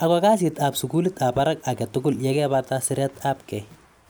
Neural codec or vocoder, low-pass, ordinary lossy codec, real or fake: none; none; none; real